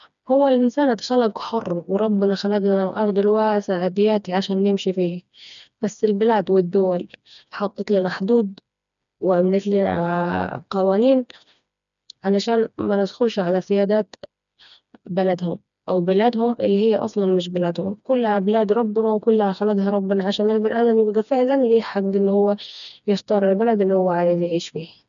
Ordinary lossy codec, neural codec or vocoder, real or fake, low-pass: none; codec, 16 kHz, 2 kbps, FreqCodec, smaller model; fake; 7.2 kHz